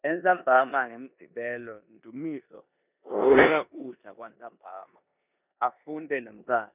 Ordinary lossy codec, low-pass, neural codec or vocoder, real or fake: none; 3.6 kHz; codec, 16 kHz in and 24 kHz out, 0.9 kbps, LongCat-Audio-Codec, four codebook decoder; fake